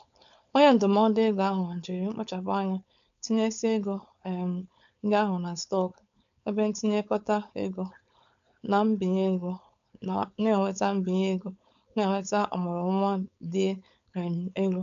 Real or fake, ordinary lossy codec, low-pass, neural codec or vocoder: fake; none; 7.2 kHz; codec, 16 kHz, 4.8 kbps, FACodec